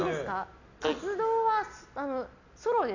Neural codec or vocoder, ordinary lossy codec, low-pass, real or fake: none; none; 7.2 kHz; real